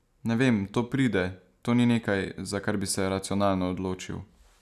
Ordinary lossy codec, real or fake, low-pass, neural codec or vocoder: none; real; 14.4 kHz; none